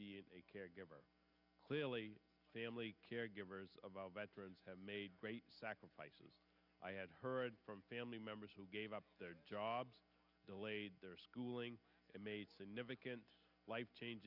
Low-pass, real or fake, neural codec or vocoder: 5.4 kHz; real; none